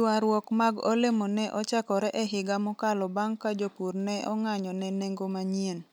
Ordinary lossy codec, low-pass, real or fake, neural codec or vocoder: none; none; real; none